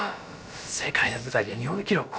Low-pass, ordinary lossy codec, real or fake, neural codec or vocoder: none; none; fake; codec, 16 kHz, about 1 kbps, DyCAST, with the encoder's durations